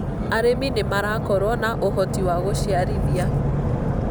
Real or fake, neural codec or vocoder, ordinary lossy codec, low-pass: real; none; none; none